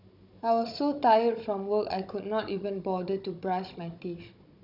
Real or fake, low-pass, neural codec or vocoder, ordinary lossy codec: fake; 5.4 kHz; codec, 16 kHz, 16 kbps, FunCodec, trained on Chinese and English, 50 frames a second; none